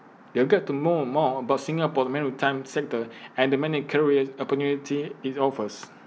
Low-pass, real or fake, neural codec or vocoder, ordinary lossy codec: none; real; none; none